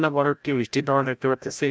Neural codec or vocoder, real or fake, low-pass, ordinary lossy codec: codec, 16 kHz, 0.5 kbps, FreqCodec, larger model; fake; none; none